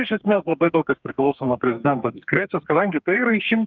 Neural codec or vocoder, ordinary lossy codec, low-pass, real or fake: codec, 32 kHz, 1.9 kbps, SNAC; Opus, 32 kbps; 7.2 kHz; fake